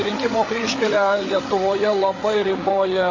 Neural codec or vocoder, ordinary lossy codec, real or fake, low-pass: codec, 16 kHz, 8 kbps, FreqCodec, larger model; MP3, 32 kbps; fake; 7.2 kHz